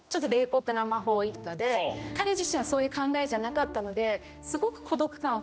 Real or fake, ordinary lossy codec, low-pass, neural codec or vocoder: fake; none; none; codec, 16 kHz, 1 kbps, X-Codec, HuBERT features, trained on general audio